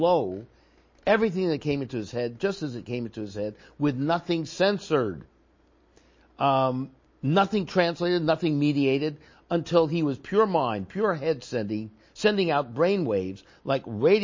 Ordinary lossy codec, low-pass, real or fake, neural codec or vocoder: MP3, 32 kbps; 7.2 kHz; real; none